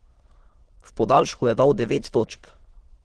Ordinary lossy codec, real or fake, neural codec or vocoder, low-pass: Opus, 16 kbps; fake; autoencoder, 22.05 kHz, a latent of 192 numbers a frame, VITS, trained on many speakers; 9.9 kHz